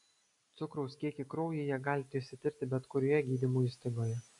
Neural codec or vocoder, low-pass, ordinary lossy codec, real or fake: none; 10.8 kHz; AAC, 48 kbps; real